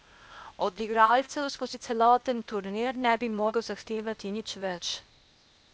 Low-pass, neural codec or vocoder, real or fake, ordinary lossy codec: none; codec, 16 kHz, 0.8 kbps, ZipCodec; fake; none